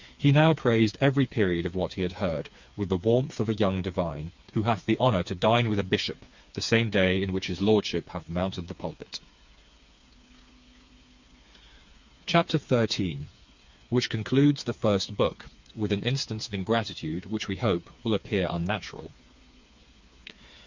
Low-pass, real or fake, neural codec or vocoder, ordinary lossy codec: 7.2 kHz; fake; codec, 16 kHz, 4 kbps, FreqCodec, smaller model; Opus, 64 kbps